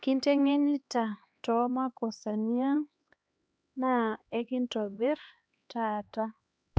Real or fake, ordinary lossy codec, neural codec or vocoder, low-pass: fake; none; codec, 16 kHz, 2 kbps, X-Codec, HuBERT features, trained on LibriSpeech; none